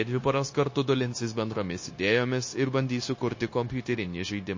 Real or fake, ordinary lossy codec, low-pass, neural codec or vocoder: fake; MP3, 32 kbps; 7.2 kHz; codec, 16 kHz, 0.9 kbps, LongCat-Audio-Codec